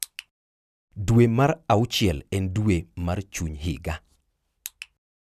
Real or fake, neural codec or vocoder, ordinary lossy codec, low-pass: real; none; none; 14.4 kHz